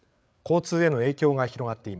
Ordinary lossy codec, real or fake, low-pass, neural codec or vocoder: none; fake; none; codec, 16 kHz, 8 kbps, FreqCodec, larger model